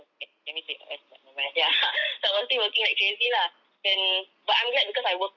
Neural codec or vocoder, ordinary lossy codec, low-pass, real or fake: none; none; 7.2 kHz; real